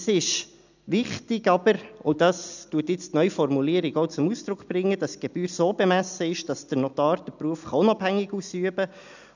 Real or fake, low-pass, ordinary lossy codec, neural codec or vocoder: real; 7.2 kHz; none; none